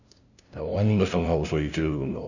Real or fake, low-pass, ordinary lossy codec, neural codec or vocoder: fake; 7.2 kHz; none; codec, 16 kHz, 0.5 kbps, FunCodec, trained on LibriTTS, 25 frames a second